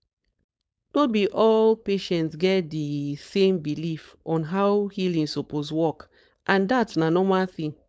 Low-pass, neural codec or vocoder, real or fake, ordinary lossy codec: none; codec, 16 kHz, 4.8 kbps, FACodec; fake; none